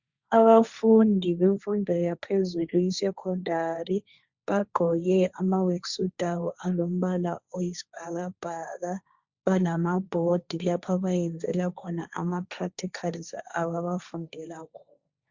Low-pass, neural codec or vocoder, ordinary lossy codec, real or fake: 7.2 kHz; codec, 16 kHz, 1.1 kbps, Voila-Tokenizer; Opus, 64 kbps; fake